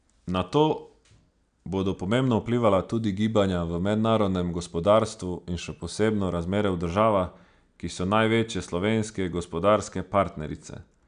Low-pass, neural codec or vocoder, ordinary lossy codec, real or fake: 9.9 kHz; none; none; real